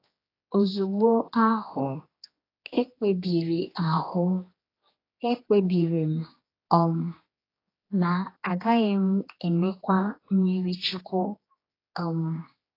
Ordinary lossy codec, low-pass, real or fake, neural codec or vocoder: AAC, 24 kbps; 5.4 kHz; fake; codec, 16 kHz, 2 kbps, X-Codec, HuBERT features, trained on general audio